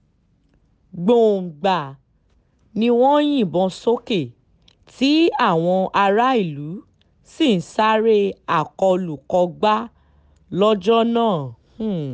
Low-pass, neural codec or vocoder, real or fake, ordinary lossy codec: none; none; real; none